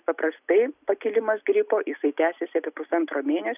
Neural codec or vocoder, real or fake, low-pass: none; real; 3.6 kHz